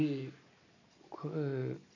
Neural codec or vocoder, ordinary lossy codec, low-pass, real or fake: none; none; 7.2 kHz; real